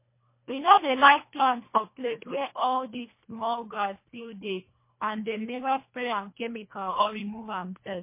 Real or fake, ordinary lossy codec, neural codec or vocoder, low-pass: fake; MP3, 24 kbps; codec, 24 kHz, 1.5 kbps, HILCodec; 3.6 kHz